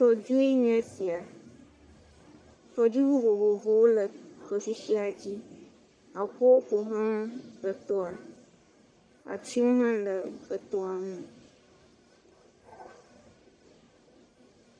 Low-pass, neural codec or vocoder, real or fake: 9.9 kHz; codec, 44.1 kHz, 1.7 kbps, Pupu-Codec; fake